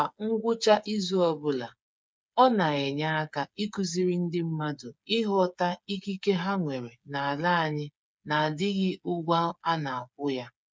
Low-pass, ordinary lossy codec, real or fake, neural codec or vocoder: none; none; fake; codec, 16 kHz, 8 kbps, FreqCodec, smaller model